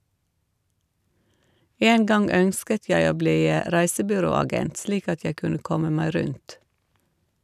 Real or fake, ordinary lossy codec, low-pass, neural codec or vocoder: real; none; 14.4 kHz; none